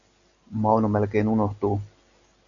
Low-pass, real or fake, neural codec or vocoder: 7.2 kHz; real; none